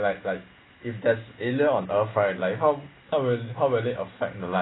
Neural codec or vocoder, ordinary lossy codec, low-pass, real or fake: none; AAC, 16 kbps; 7.2 kHz; real